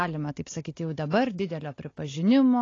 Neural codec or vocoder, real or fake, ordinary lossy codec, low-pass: none; real; AAC, 32 kbps; 7.2 kHz